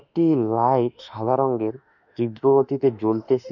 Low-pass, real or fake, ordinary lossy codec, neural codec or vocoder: 7.2 kHz; fake; none; autoencoder, 48 kHz, 32 numbers a frame, DAC-VAE, trained on Japanese speech